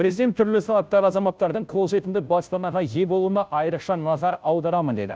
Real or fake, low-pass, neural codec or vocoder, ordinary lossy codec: fake; none; codec, 16 kHz, 0.5 kbps, FunCodec, trained on Chinese and English, 25 frames a second; none